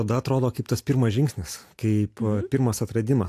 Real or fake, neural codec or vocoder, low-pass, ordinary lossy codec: real; none; 14.4 kHz; AAC, 64 kbps